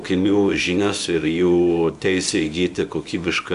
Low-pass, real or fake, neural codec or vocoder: 10.8 kHz; fake; codec, 24 kHz, 0.9 kbps, WavTokenizer, small release